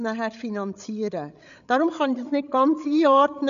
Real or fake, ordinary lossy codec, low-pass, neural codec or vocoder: fake; none; 7.2 kHz; codec, 16 kHz, 8 kbps, FreqCodec, larger model